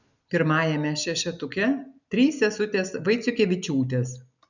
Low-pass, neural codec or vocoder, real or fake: 7.2 kHz; none; real